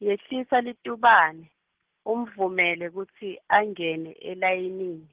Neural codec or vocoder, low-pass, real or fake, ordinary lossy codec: none; 3.6 kHz; real; Opus, 16 kbps